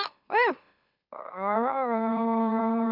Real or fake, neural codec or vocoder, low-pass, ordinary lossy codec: fake; autoencoder, 44.1 kHz, a latent of 192 numbers a frame, MeloTTS; 5.4 kHz; none